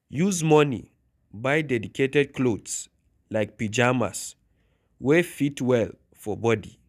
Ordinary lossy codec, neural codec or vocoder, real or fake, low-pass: none; none; real; 14.4 kHz